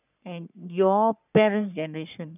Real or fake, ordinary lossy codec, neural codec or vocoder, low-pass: fake; none; codec, 44.1 kHz, 3.4 kbps, Pupu-Codec; 3.6 kHz